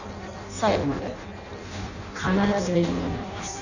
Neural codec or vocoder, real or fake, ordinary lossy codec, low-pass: codec, 16 kHz in and 24 kHz out, 0.6 kbps, FireRedTTS-2 codec; fake; none; 7.2 kHz